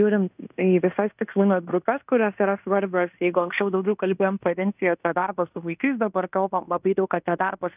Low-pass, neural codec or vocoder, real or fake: 3.6 kHz; codec, 16 kHz in and 24 kHz out, 0.9 kbps, LongCat-Audio-Codec, fine tuned four codebook decoder; fake